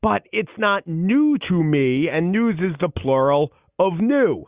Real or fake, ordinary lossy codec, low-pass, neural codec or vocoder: real; Opus, 64 kbps; 3.6 kHz; none